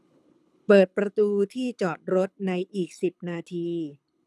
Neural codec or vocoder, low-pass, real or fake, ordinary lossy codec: codec, 24 kHz, 6 kbps, HILCodec; none; fake; none